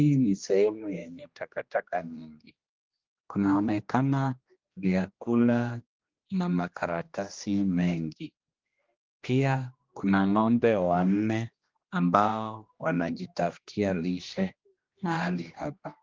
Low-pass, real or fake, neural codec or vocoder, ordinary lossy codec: 7.2 kHz; fake; codec, 16 kHz, 1 kbps, X-Codec, HuBERT features, trained on general audio; Opus, 32 kbps